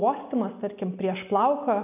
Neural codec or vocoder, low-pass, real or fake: none; 3.6 kHz; real